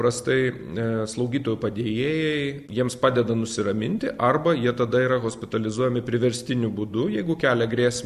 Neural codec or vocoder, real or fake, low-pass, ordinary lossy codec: none; real; 14.4 kHz; AAC, 96 kbps